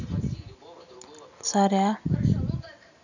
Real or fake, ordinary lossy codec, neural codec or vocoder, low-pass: real; none; none; 7.2 kHz